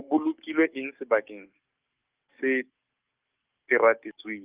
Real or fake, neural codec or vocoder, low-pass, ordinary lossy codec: fake; codec, 44.1 kHz, 7.8 kbps, DAC; 3.6 kHz; Opus, 24 kbps